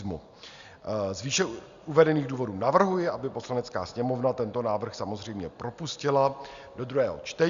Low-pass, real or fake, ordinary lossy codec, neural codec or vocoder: 7.2 kHz; real; Opus, 64 kbps; none